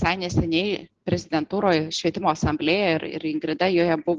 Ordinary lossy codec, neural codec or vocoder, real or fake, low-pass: Opus, 16 kbps; none; real; 7.2 kHz